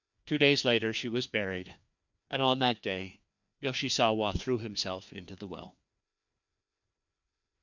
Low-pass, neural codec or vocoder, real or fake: 7.2 kHz; codec, 16 kHz, 2 kbps, FreqCodec, larger model; fake